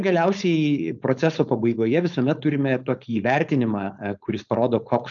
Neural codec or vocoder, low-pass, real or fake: codec, 16 kHz, 4.8 kbps, FACodec; 7.2 kHz; fake